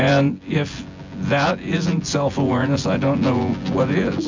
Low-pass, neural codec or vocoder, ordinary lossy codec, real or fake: 7.2 kHz; vocoder, 24 kHz, 100 mel bands, Vocos; MP3, 64 kbps; fake